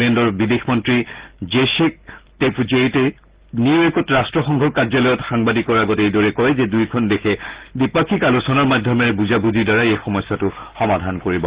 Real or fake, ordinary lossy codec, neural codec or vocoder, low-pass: real; Opus, 16 kbps; none; 3.6 kHz